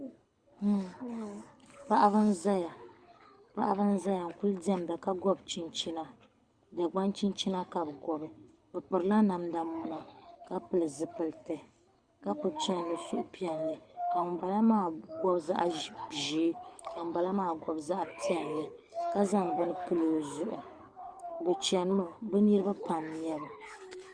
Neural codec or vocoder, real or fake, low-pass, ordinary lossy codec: codec, 24 kHz, 6 kbps, HILCodec; fake; 9.9 kHz; Opus, 64 kbps